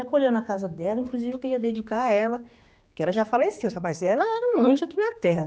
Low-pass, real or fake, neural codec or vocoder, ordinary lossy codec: none; fake; codec, 16 kHz, 2 kbps, X-Codec, HuBERT features, trained on general audio; none